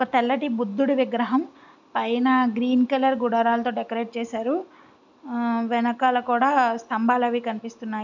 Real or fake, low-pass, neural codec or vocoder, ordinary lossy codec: real; 7.2 kHz; none; none